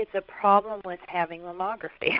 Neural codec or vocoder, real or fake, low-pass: codec, 16 kHz, 16 kbps, FreqCodec, larger model; fake; 5.4 kHz